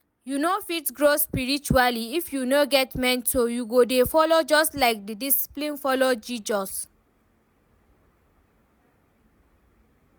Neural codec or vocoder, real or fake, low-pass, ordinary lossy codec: none; real; none; none